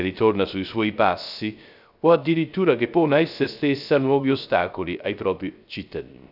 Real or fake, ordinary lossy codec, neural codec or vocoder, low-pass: fake; none; codec, 16 kHz, 0.3 kbps, FocalCodec; 5.4 kHz